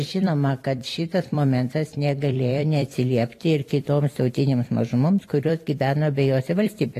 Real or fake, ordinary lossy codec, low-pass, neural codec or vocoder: fake; AAC, 48 kbps; 14.4 kHz; vocoder, 44.1 kHz, 128 mel bands every 256 samples, BigVGAN v2